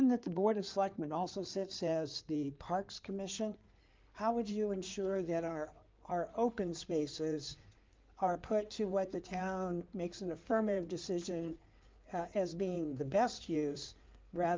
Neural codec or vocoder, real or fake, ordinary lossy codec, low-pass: codec, 16 kHz in and 24 kHz out, 2.2 kbps, FireRedTTS-2 codec; fake; Opus, 24 kbps; 7.2 kHz